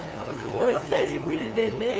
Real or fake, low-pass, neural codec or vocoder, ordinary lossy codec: fake; none; codec, 16 kHz, 2 kbps, FunCodec, trained on LibriTTS, 25 frames a second; none